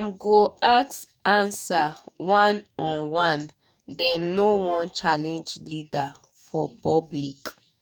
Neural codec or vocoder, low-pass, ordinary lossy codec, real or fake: codec, 44.1 kHz, 2.6 kbps, DAC; 19.8 kHz; none; fake